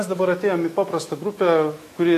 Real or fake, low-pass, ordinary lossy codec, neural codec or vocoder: fake; 14.4 kHz; AAC, 48 kbps; autoencoder, 48 kHz, 128 numbers a frame, DAC-VAE, trained on Japanese speech